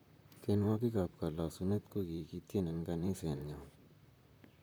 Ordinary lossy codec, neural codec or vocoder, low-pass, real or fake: none; vocoder, 44.1 kHz, 128 mel bands, Pupu-Vocoder; none; fake